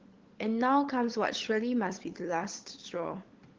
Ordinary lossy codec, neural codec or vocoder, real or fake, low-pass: Opus, 16 kbps; codec, 16 kHz, 16 kbps, FunCodec, trained on LibriTTS, 50 frames a second; fake; 7.2 kHz